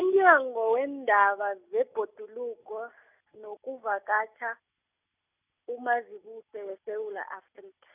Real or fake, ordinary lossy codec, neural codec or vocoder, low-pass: real; none; none; 3.6 kHz